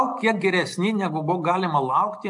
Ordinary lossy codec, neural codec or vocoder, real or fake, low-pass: AAC, 64 kbps; none; real; 10.8 kHz